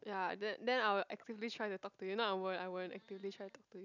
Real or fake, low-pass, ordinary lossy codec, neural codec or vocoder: real; 7.2 kHz; none; none